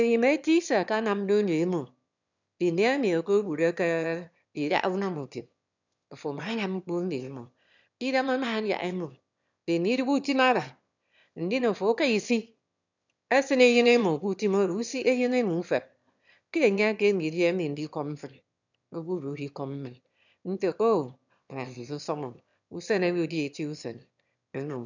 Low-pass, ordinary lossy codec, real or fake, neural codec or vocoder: 7.2 kHz; none; fake; autoencoder, 22.05 kHz, a latent of 192 numbers a frame, VITS, trained on one speaker